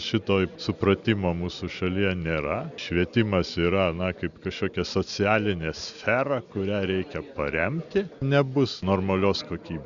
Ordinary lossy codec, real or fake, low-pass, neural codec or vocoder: Opus, 64 kbps; real; 7.2 kHz; none